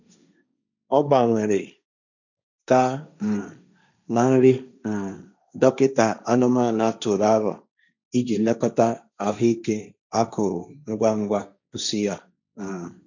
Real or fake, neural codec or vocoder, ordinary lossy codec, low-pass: fake; codec, 16 kHz, 1.1 kbps, Voila-Tokenizer; none; 7.2 kHz